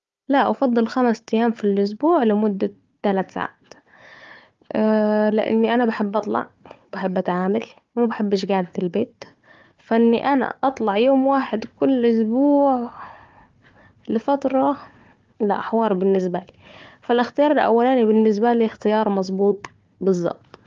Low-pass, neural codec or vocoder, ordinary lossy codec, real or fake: 7.2 kHz; codec, 16 kHz, 4 kbps, FunCodec, trained on Chinese and English, 50 frames a second; Opus, 32 kbps; fake